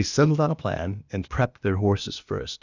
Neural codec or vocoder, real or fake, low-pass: codec, 16 kHz, 0.8 kbps, ZipCodec; fake; 7.2 kHz